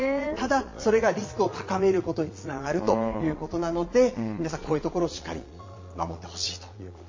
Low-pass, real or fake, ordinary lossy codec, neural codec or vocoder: 7.2 kHz; fake; MP3, 32 kbps; vocoder, 22.05 kHz, 80 mel bands, Vocos